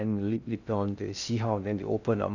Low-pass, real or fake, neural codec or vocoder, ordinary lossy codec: 7.2 kHz; fake; codec, 16 kHz in and 24 kHz out, 0.6 kbps, FocalCodec, streaming, 2048 codes; none